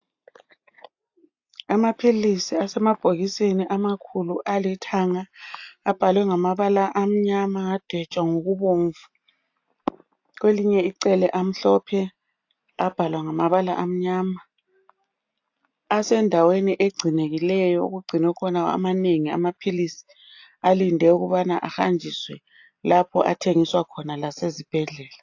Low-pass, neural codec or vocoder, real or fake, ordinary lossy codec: 7.2 kHz; none; real; AAC, 48 kbps